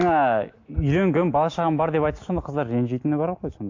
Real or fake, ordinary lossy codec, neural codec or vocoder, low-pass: real; AAC, 48 kbps; none; 7.2 kHz